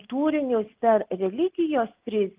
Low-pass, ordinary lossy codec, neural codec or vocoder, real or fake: 3.6 kHz; Opus, 24 kbps; none; real